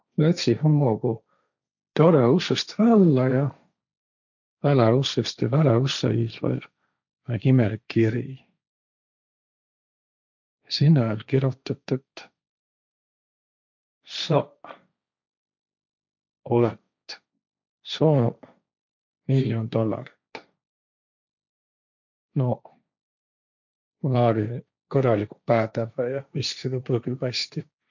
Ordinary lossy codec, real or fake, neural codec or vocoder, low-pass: none; fake; codec, 16 kHz, 1.1 kbps, Voila-Tokenizer; 7.2 kHz